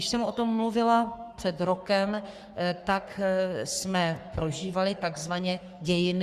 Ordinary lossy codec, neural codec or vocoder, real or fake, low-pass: Opus, 64 kbps; codec, 44.1 kHz, 3.4 kbps, Pupu-Codec; fake; 14.4 kHz